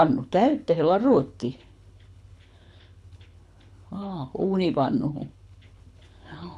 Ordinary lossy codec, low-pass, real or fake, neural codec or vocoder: none; none; fake; codec, 24 kHz, 6 kbps, HILCodec